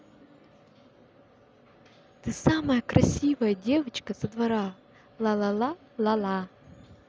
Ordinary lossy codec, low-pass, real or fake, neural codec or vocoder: none; none; real; none